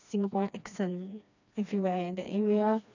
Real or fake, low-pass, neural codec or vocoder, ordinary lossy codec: fake; 7.2 kHz; codec, 16 kHz, 2 kbps, FreqCodec, smaller model; none